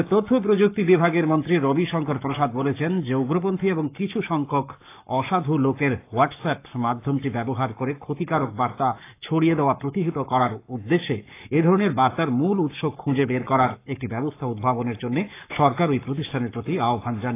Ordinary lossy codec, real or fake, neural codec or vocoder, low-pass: AAC, 24 kbps; fake; codec, 44.1 kHz, 7.8 kbps, Pupu-Codec; 3.6 kHz